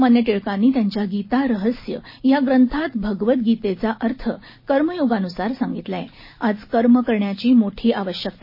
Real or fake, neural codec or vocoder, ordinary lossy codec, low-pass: real; none; MP3, 24 kbps; 5.4 kHz